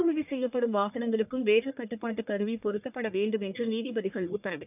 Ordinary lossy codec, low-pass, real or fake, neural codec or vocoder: none; 3.6 kHz; fake; codec, 44.1 kHz, 1.7 kbps, Pupu-Codec